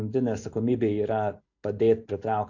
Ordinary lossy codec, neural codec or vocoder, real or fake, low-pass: AAC, 48 kbps; none; real; 7.2 kHz